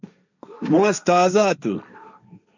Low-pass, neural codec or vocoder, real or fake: 7.2 kHz; codec, 16 kHz, 1.1 kbps, Voila-Tokenizer; fake